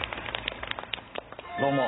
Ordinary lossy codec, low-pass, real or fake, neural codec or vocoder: AAC, 16 kbps; 7.2 kHz; real; none